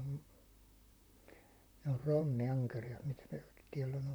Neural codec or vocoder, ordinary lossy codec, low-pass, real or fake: vocoder, 44.1 kHz, 128 mel bands, Pupu-Vocoder; none; none; fake